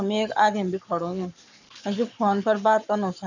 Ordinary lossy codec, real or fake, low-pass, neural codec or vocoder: none; fake; 7.2 kHz; codec, 44.1 kHz, 7.8 kbps, Pupu-Codec